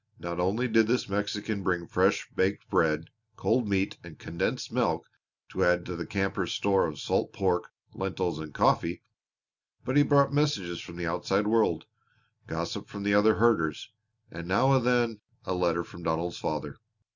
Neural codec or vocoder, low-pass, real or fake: none; 7.2 kHz; real